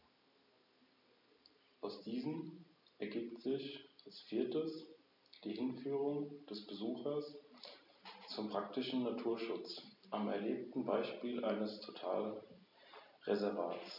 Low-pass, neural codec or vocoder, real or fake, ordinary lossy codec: 5.4 kHz; none; real; none